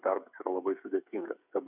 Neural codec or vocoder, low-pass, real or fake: codec, 16 kHz, 8 kbps, FreqCodec, smaller model; 3.6 kHz; fake